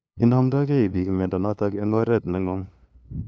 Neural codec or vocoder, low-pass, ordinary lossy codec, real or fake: codec, 16 kHz, 2 kbps, FunCodec, trained on LibriTTS, 25 frames a second; none; none; fake